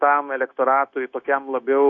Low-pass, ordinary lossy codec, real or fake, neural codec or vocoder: 7.2 kHz; AAC, 48 kbps; fake; codec, 16 kHz, 0.9 kbps, LongCat-Audio-Codec